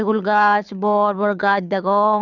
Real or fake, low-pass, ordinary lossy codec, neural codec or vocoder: fake; 7.2 kHz; none; codec, 24 kHz, 6 kbps, HILCodec